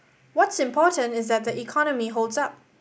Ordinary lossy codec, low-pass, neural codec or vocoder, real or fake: none; none; none; real